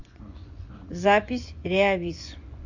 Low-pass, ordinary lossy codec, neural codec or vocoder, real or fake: 7.2 kHz; AAC, 48 kbps; vocoder, 24 kHz, 100 mel bands, Vocos; fake